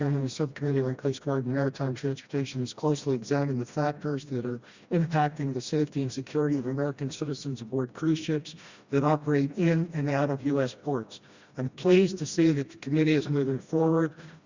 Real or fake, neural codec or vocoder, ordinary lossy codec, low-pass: fake; codec, 16 kHz, 1 kbps, FreqCodec, smaller model; Opus, 64 kbps; 7.2 kHz